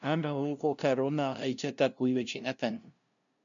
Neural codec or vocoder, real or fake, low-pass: codec, 16 kHz, 0.5 kbps, FunCodec, trained on LibriTTS, 25 frames a second; fake; 7.2 kHz